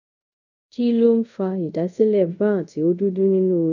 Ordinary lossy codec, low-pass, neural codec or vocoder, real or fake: none; 7.2 kHz; codec, 24 kHz, 0.5 kbps, DualCodec; fake